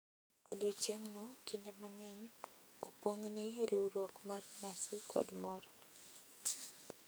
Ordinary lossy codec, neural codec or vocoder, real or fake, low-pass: none; codec, 44.1 kHz, 2.6 kbps, SNAC; fake; none